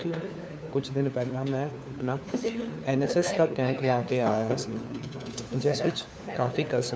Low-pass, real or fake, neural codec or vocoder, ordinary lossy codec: none; fake; codec, 16 kHz, 4 kbps, FunCodec, trained on LibriTTS, 50 frames a second; none